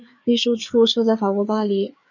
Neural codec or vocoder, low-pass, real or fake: codec, 16 kHz, 4 kbps, FreqCodec, larger model; 7.2 kHz; fake